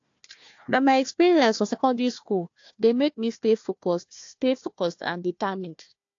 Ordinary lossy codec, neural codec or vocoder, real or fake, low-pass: AAC, 48 kbps; codec, 16 kHz, 1 kbps, FunCodec, trained on Chinese and English, 50 frames a second; fake; 7.2 kHz